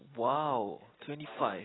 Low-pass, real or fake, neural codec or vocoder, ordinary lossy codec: 7.2 kHz; real; none; AAC, 16 kbps